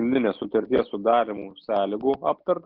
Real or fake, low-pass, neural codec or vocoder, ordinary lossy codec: real; 5.4 kHz; none; Opus, 32 kbps